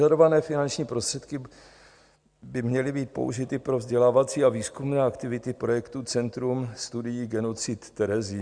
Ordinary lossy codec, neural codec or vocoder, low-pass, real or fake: Opus, 64 kbps; none; 9.9 kHz; real